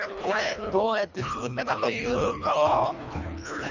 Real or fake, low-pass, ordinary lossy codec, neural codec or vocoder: fake; 7.2 kHz; none; codec, 24 kHz, 1.5 kbps, HILCodec